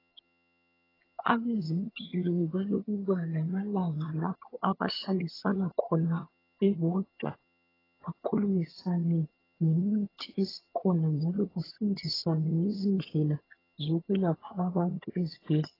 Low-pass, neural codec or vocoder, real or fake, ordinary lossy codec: 5.4 kHz; vocoder, 22.05 kHz, 80 mel bands, HiFi-GAN; fake; AAC, 24 kbps